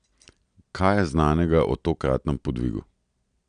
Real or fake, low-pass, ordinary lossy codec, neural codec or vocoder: real; 9.9 kHz; none; none